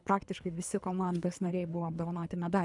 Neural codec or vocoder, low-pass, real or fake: codec, 24 kHz, 3 kbps, HILCodec; 10.8 kHz; fake